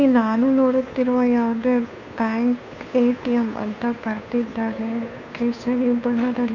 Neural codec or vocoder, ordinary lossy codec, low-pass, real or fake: codec, 16 kHz, 2 kbps, FunCodec, trained on Chinese and English, 25 frames a second; none; 7.2 kHz; fake